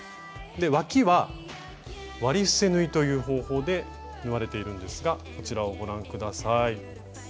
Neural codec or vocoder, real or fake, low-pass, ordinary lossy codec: none; real; none; none